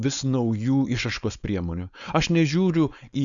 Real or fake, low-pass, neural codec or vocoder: fake; 7.2 kHz; codec, 16 kHz, 4.8 kbps, FACodec